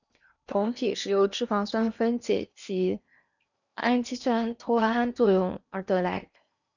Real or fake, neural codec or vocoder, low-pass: fake; codec, 16 kHz in and 24 kHz out, 0.8 kbps, FocalCodec, streaming, 65536 codes; 7.2 kHz